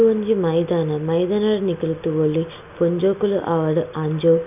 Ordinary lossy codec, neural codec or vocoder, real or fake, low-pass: none; none; real; 3.6 kHz